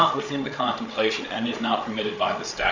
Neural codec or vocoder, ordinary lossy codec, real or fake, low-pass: codec, 16 kHz, 8 kbps, FreqCodec, larger model; Opus, 64 kbps; fake; 7.2 kHz